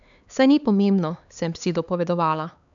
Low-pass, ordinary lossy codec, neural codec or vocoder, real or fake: 7.2 kHz; none; codec, 16 kHz, 4 kbps, X-Codec, HuBERT features, trained on LibriSpeech; fake